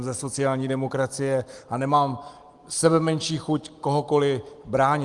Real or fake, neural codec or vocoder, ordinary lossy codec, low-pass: real; none; Opus, 24 kbps; 10.8 kHz